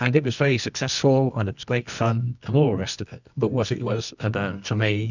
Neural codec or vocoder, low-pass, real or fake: codec, 24 kHz, 0.9 kbps, WavTokenizer, medium music audio release; 7.2 kHz; fake